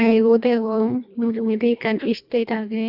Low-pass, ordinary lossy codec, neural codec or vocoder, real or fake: 5.4 kHz; AAC, 48 kbps; codec, 24 kHz, 1.5 kbps, HILCodec; fake